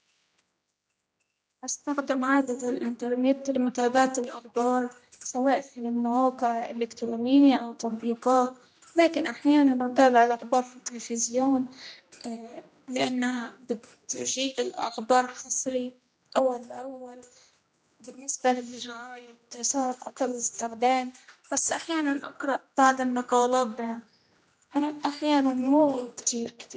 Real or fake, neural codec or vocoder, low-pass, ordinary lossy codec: fake; codec, 16 kHz, 1 kbps, X-Codec, HuBERT features, trained on general audio; none; none